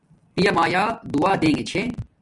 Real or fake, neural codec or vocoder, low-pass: real; none; 10.8 kHz